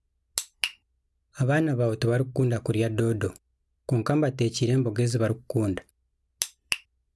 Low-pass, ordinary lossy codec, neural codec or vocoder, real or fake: none; none; none; real